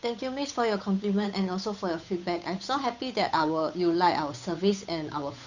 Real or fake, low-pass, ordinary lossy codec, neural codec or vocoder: fake; 7.2 kHz; none; codec, 16 kHz, 8 kbps, FunCodec, trained on Chinese and English, 25 frames a second